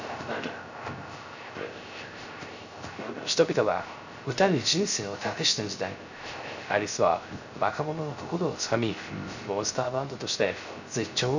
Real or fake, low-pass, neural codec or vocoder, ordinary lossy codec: fake; 7.2 kHz; codec, 16 kHz, 0.3 kbps, FocalCodec; none